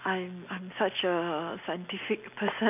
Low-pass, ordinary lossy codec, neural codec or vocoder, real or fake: 3.6 kHz; none; none; real